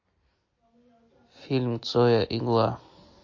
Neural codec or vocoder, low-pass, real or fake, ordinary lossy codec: none; 7.2 kHz; real; MP3, 32 kbps